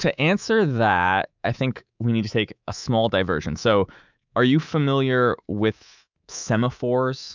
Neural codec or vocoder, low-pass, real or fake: codec, 24 kHz, 3.1 kbps, DualCodec; 7.2 kHz; fake